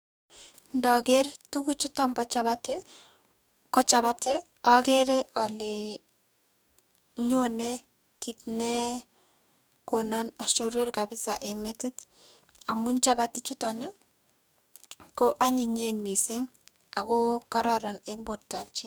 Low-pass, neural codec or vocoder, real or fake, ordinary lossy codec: none; codec, 44.1 kHz, 2.6 kbps, DAC; fake; none